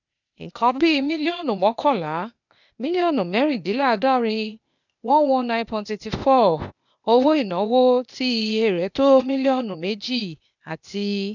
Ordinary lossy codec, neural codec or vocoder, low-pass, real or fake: none; codec, 16 kHz, 0.8 kbps, ZipCodec; 7.2 kHz; fake